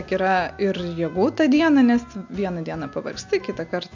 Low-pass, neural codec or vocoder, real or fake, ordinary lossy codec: 7.2 kHz; none; real; MP3, 48 kbps